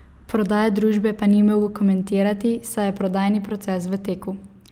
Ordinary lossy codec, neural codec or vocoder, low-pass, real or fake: Opus, 32 kbps; none; 19.8 kHz; real